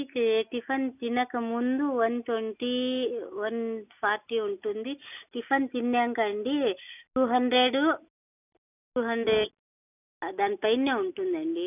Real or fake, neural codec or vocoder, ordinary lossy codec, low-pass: real; none; none; 3.6 kHz